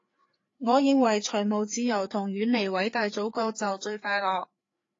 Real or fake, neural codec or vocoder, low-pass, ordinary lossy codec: fake; codec, 16 kHz, 4 kbps, FreqCodec, larger model; 7.2 kHz; AAC, 32 kbps